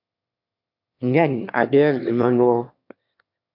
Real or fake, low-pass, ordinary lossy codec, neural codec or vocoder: fake; 5.4 kHz; MP3, 48 kbps; autoencoder, 22.05 kHz, a latent of 192 numbers a frame, VITS, trained on one speaker